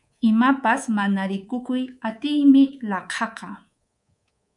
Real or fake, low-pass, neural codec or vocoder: fake; 10.8 kHz; codec, 24 kHz, 3.1 kbps, DualCodec